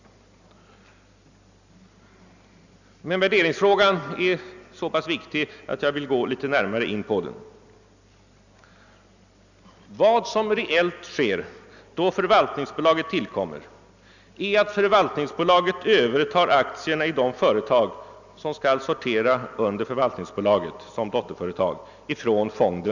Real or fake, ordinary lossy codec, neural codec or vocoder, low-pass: real; none; none; 7.2 kHz